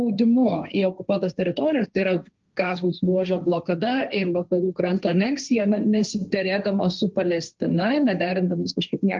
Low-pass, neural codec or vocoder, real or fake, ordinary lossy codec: 7.2 kHz; codec, 16 kHz, 1.1 kbps, Voila-Tokenizer; fake; Opus, 24 kbps